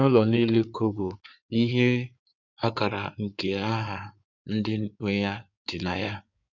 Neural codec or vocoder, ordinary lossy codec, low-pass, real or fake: codec, 16 kHz in and 24 kHz out, 2.2 kbps, FireRedTTS-2 codec; none; 7.2 kHz; fake